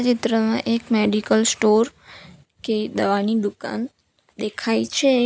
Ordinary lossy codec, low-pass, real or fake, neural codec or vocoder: none; none; real; none